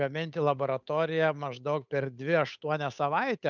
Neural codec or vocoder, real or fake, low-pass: codec, 16 kHz, 8 kbps, FunCodec, trained on Chinese and English, 25 frames a second; fake; 7.2 kHz